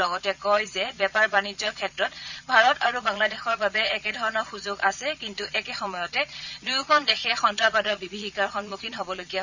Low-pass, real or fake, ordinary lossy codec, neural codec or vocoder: 7.2 kHz; fake; none; vocoder, 22.05 kHz, 80 mel bands, Vocos